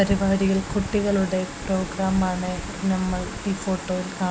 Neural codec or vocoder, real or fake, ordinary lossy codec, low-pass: none; real; none; none